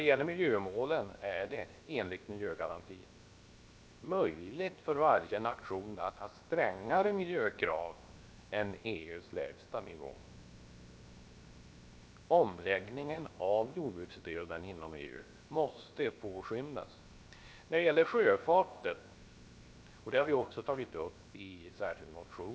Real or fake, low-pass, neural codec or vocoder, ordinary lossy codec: fake; none; codec, 16 kHz, 0.7 kbps, FocalCodec; none